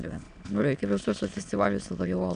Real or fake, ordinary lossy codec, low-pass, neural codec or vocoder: fake; AAC, 96 kbps; 9.9 kHz; autoencoder, 22.05 kHz, a latent of 192 numbers a frame, VITS, trained on many speakers